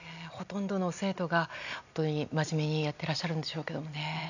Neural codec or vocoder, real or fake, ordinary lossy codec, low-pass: none; real; none; 7.2 kHz